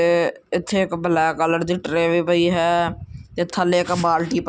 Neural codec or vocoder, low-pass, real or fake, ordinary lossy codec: none; none; real; none